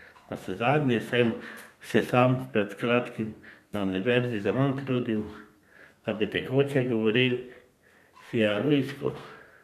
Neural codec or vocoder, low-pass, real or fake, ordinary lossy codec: codec, 32 kHz, 1.9 kbps, SNAC; 14.4 kHz; fake; none